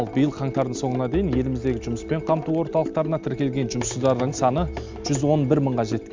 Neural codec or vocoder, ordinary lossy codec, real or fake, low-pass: none; MP3, 64 kbps; real; 7.2 kHz